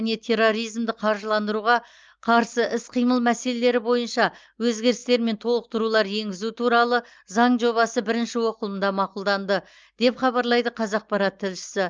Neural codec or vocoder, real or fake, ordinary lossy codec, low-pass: none; real; Opus, 24 kbps; 7.2 kHz